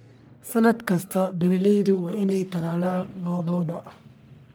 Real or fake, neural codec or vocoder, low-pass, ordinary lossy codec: fake; codec, 44.1 kHz, 1.7 kbps, Pupu-Codec; none; none